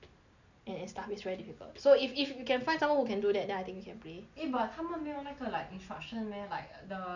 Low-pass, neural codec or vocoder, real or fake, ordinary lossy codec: 7.2 kHz; none; real; none